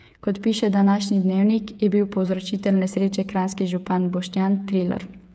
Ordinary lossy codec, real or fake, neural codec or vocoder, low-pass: none; fake; codec, 16 kHz, 8 kbps, FreqCodec, smaller model; none